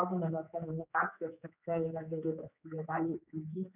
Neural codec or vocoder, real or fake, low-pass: codec, 16 kHz, 4 kbps, X-Codec, HuBERT features, trained on general audio; fake; 3.6 kHz